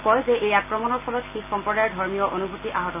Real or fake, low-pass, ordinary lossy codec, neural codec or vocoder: real; 3.6 kHz; none; none